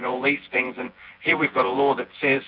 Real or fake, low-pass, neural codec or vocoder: fake; 5.4 kHz; vocoder, 24 kHz, 100 mel bands, Vocos